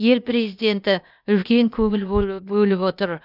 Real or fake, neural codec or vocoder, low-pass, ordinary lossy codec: fake; codec, 16 kHz, 0.8 kbps, ZipCodec; 5.4 kHz; none